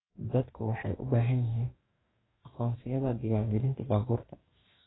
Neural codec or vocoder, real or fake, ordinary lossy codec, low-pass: codec, 44.1 kHz, 2.6 kbps, DAC; fake; AAC, 16 kbps; 7.2 kHz